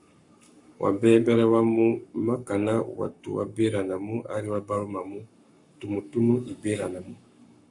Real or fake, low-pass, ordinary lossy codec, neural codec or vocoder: fake; 10.8 kHz; AAC, 64 kbps; codec, 44.1 kHz, 7.8 kbps, Pupu-Codec